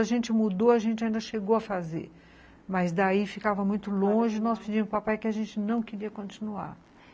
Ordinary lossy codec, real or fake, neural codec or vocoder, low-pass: none; real; none; none